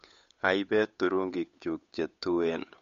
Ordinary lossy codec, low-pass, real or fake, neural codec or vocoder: MP3, 48 kbps; 7.2 kHz; fake; codec, 16 kHz, 8 kbps, FunCodec, trained on Chinese and English, 25 frames a second